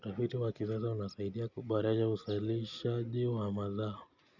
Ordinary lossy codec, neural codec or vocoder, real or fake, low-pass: none; none; real; 7.2 kHz